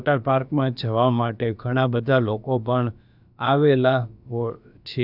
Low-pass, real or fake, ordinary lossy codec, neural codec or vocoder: 5.4 kHz; fake; none; codec, 16 kHz, about 1 kbps, DyCAST, with the encoder's durations